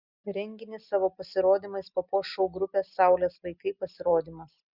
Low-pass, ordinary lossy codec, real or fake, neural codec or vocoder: 5.4 kHz; Opus, 64 kbps; real; none